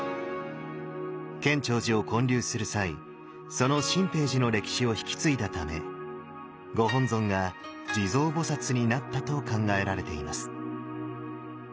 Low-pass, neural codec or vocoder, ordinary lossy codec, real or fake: none; none; none; real